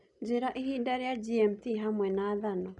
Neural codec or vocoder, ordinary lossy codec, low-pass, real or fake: none; none; 10.8 kHz; real